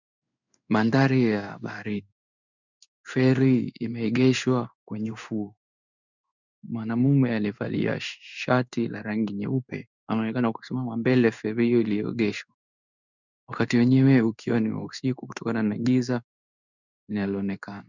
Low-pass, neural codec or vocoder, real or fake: 7.2 kHz; codec, 16 kHz in and 24 kHz out, 1 kbps, XY-Tokenizer; fake